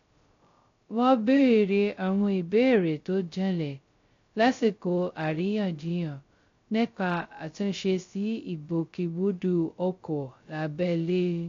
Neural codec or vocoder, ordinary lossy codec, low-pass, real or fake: codec, 16 kHz, 0.2 kbps, FocalCodec; AAC, 48 kbps; 7.2 kHz; fake